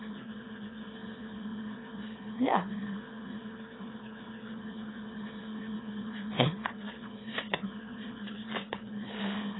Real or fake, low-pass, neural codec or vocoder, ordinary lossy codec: fake; 7.2 kHz; autoencoder, 22.05 kHz, a latent of 192 numbers a frame, VITS, trained on one speaker; AAC, 16 kbps